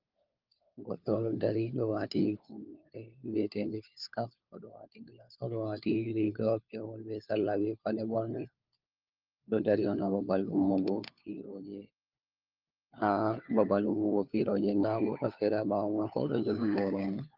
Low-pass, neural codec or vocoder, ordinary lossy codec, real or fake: 5.4 kHz; codec, 16 kHz, 4 kbps, FunCodec, trained on LibriTTS, 50 frames a second; Opus, 32 kbps; fake